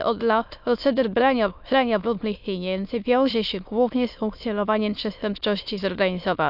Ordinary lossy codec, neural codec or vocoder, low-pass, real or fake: none; autoencoder, 22.05 kHz, a latent of 192 numbers a frame, VITS, trained on many speakers; 5.4 kHz; fake